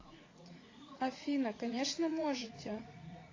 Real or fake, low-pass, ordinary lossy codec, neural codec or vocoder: fake; 7.2 kHz; AAC, 32 kbps; vocoder, 44.1 kHz, 80 mel bands, Vocos